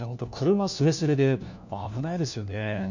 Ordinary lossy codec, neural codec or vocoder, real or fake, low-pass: none; codec, 16 kHz, 1 kbps, FunCodec, trained on LibriTTS, 50 frames a second; fake; 7.2 kHz